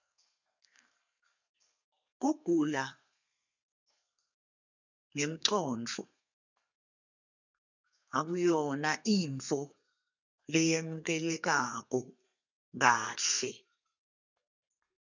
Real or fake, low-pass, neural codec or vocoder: fake; 7.2 kHz; codec, 32 kHz, 1.9 kbps, SNAC